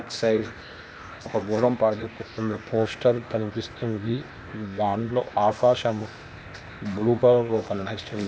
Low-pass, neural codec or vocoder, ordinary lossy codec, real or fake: none; codec, 16 kHz, 0.8 kbps, ZipCodec; none; fake